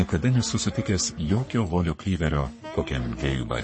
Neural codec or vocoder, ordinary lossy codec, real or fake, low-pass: codec, 44.1 kHz, 3.4 kbps, Pupu-Codec; MP3, 32 kbps; fake; 9.9 kHz